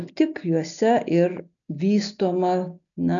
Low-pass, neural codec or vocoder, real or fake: 7.2 kHz; none; real